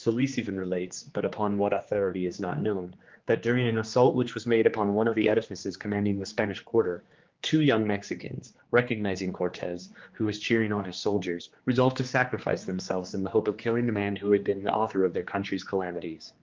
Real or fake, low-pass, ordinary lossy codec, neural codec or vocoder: fake; 7.2 kHz; Opus, 32 kbps; codec, 16 kHz, 2 kbps, X-Codec, HuBERT features, trained on general audio